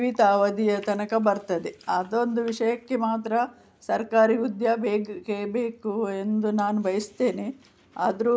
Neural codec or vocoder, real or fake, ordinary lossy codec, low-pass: none; real; none; none